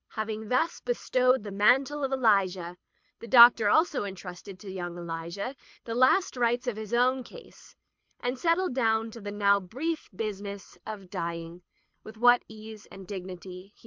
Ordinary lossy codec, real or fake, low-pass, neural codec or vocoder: MP3, 64 kbps; fake; 7.2 kHz; codec, 24 kHz, 6 kbps, HILCodec